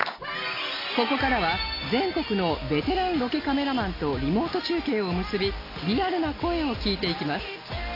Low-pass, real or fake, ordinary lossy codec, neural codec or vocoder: 5.4 kHz; real; AAC, 24 kbps; none